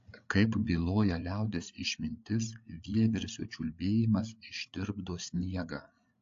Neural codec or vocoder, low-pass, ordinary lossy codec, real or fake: codec, 16 kHz, 8 kbps, FreqCodec, larger model; 7.2 kHz; AAC, 48 kbps; fake